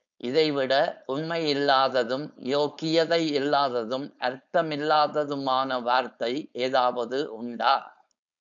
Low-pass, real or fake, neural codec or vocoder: 7.2 kHz; fake; codec, 16 kHz, 4.8 kbps, FACodec